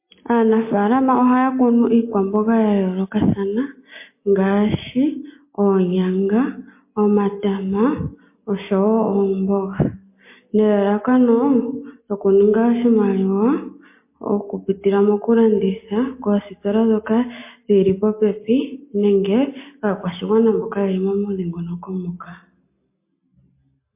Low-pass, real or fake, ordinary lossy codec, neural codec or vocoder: 3.6 kHz; real; MP3, 24 kbps; none